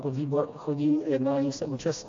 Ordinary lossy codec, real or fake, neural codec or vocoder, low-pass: AAC, 48 kbps; fake; codec, 16 kHz, 1 kbps, FreqCodec, smaller model; 7.2 kHz